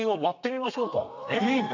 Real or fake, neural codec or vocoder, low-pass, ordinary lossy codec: fake; codec, 32 kHz, 1.9 kbps, SNAC; 7.2 kHz; none